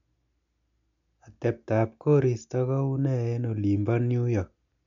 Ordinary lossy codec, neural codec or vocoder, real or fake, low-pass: MP3, 64 kbps; none; real; 7.2 kHz